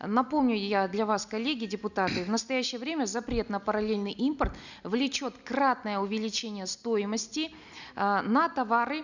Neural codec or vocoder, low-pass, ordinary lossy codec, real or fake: none; 7.2 kHz; none; real